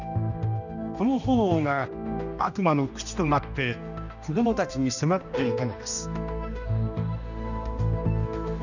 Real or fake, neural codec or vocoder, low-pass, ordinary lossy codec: fake; codec, 16 kHz, 1 kbps, X-Codec, HuBERT features, trained on general audio; 7.2 kHz; none